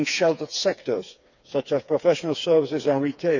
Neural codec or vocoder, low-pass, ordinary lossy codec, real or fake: codec, 16 kHz, 4 kbps, FreqCodec, smaller model; 7.2 kHz; none; fake